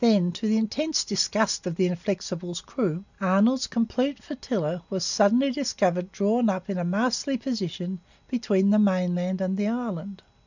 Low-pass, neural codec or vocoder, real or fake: 7.2 kHz; none; real